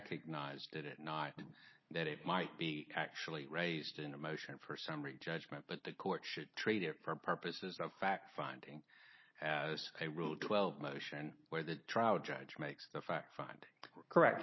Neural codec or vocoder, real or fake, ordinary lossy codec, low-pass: none; real; MP3, 24 kbps; 7.2 kHz